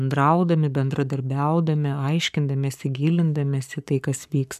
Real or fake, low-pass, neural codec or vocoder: fake; 14.4 kHz; codec, 44.1 kHz, 7.8 kbps, Pupu-Codec